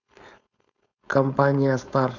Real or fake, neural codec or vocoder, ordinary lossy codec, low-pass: fake; codec, 16 kHz, 4.8 kbps, FACodec; none; 7.2 kHz